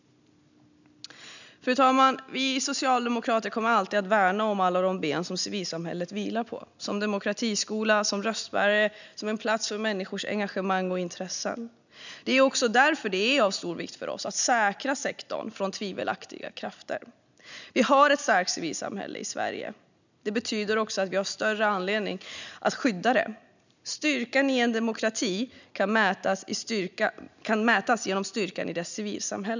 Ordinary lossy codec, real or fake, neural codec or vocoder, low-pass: none; real; none; 7.2 kHz